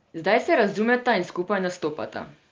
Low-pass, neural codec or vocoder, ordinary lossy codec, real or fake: 7.2 kHz; none; Opus, 24 kbps; real